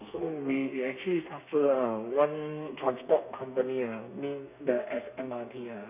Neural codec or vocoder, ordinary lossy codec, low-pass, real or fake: codec, 32 kHz, 1.9 kbps, SNAC; none; 3.6 kHz; fake